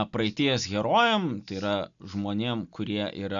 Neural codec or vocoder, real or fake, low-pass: none; real; 7.2 kHz